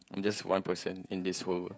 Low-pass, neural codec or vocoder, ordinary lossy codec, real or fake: none; codec, 16 kHz, 16 kbps, FreqCodec, smaller model; none; fake